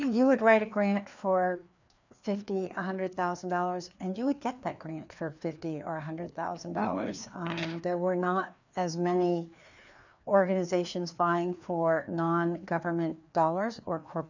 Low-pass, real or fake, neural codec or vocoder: 7.2 kHz; fake; codec, 16 kHz, 2 kbps, FreqCodec, larger model